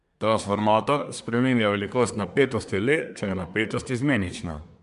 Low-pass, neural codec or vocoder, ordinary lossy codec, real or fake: 10.8 kHz; codec, 24 kHz, 1 kbps, SNAC; MP3, 96 kbps; fake